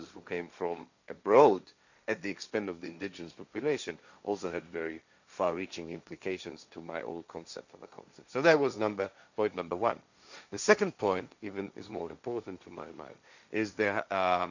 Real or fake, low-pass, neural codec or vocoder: fake; 7.2 kHz; codec, 16 kHz, 1.1 kbps, Voila-Tokenizer